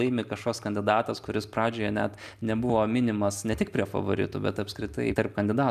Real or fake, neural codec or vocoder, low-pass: real; none; 14.4 kHz